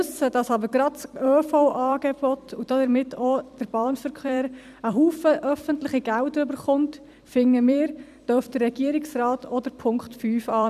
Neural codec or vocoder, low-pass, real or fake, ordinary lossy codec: vocoder, 44.1 kHz, 128 mel bands every 256 samples, BigVGAN v2; 14.4 kHz; fake; none